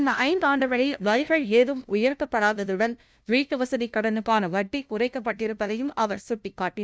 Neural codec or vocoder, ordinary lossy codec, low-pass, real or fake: codec, 16 kHz, 0.5 kbps, FunCodec, trained on LibriTTS, 25 frames a second; none; none; fake